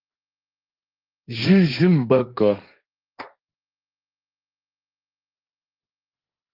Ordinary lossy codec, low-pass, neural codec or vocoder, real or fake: Opus, 32 kbps; 5.4 kHz; codec, 16 kHz, 1.1 kbps, Voila-Tokenizer; fake